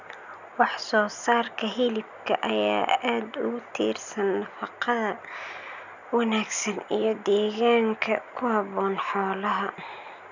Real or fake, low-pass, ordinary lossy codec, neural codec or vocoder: real; 7.2 kHz; none; none